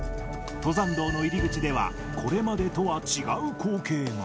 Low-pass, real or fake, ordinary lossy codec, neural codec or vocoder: none; real; none; none